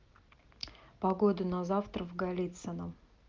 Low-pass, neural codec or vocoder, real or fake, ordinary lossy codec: 7.2 kHz; none; real; Opus, 32 kbps